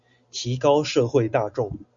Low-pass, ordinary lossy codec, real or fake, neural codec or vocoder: 7.2 kHz; Opus, 64 kbps; real; none